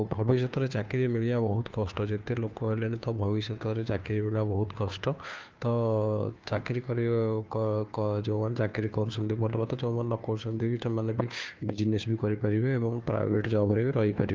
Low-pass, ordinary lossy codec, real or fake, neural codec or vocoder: 7.2 kHz; Opus, 24 kbps; fake; codec, 16 kHz, 2 kbps, FunCodec, trained on Chinese and English, 25 frames a second